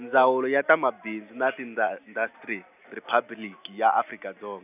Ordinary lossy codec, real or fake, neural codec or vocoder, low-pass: none; real; none; 3.6 kHz